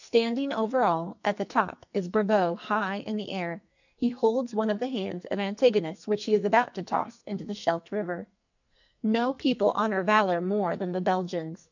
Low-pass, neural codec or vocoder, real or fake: 7.2 kHz; codec, 44.1 kHz, 2.6 kbps, SNAC; fake